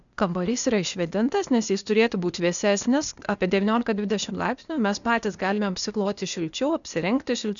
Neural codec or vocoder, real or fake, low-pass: codec, 16 kHz, 0.8 kbps, ZipCodec; fake; 7.2 kHz